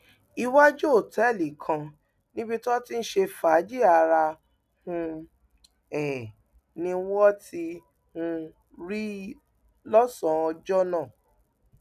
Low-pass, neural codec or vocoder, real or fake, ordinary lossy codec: 14.4 kHz; none; real; none